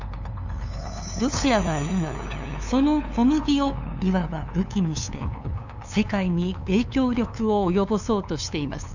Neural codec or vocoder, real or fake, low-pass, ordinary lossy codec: codec, 16 kHz, 2 kbps, FunCodec, trained on LibriTTS, 25 frames a second; fake; 7.2 kHz; none